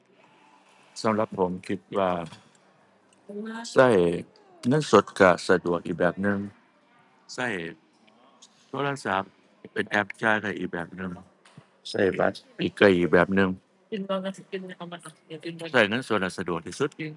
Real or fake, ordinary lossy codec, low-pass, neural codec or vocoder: real; none; 10.8 kHz; none